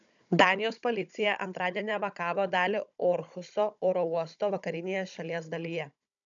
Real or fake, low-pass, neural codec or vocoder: fake; 7.2 kHz; codec, 16 kHz, 16 kbps, FunCodec, trained on Chinese and English, 50 frames a second